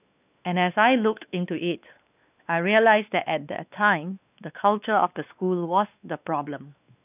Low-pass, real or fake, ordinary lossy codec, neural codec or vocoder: 3.6 kHz; fake; none; codec, 16 kHz, 2 kbps, X-Codec, WavLM features, trained on Multilingual LibriSpeech